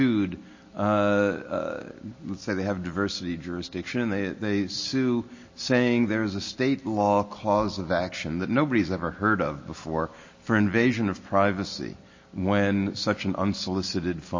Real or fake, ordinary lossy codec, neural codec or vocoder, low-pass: real; MP3, 32 kbps; none; 7.2 kHz